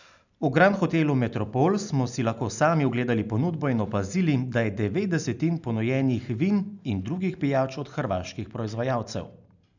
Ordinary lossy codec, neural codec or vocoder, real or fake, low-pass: none; none; real; 7.2 kHz